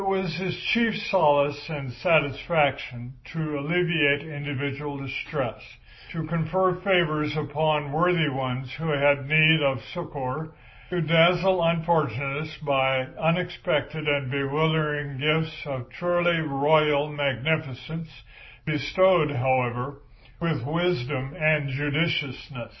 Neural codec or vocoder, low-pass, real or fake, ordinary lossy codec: none; 7.2 kHz; real; MP3, 24 kbps